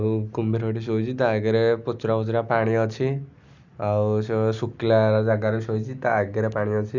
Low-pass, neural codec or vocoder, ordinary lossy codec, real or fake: 7.2 kHz; none; none; real